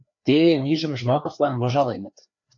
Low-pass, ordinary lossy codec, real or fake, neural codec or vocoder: 7.2 kHz; AAC, 64 kbps; fake; codec, 16 kHz, 2 kbps, FreqCodec, larger model